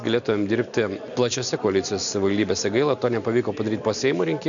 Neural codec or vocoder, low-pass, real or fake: none; 7.2 kHz; real